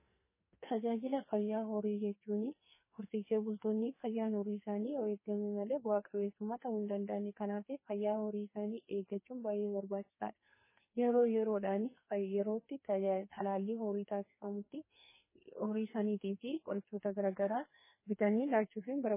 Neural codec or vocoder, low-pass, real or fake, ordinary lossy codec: codec, 44.1 kHz, 2.6 kbps, SNAC; 3.6 kHz; fake; MP3, 16 kbps